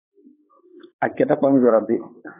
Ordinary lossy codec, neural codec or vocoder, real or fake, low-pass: AAC, 24 kbps; codec, 16 kHz, 4 kbps, X-Codec, WavLM features, trained on Multilingual LibriSpeech; fake; 3.6 kHz